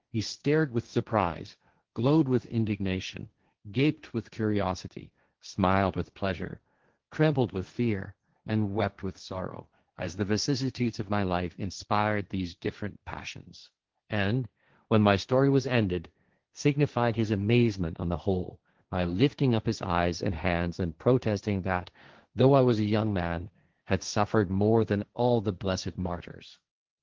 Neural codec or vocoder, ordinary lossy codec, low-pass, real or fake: codec, 16 kHz, 1.1 kbps, Voila-Tokenizer; Opus, 16 kbps; 7.2 kHz; fake